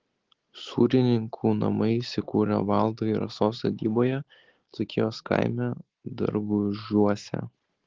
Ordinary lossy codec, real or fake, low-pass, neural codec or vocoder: Opus, 16 kbps; real; 7.2 kHz; none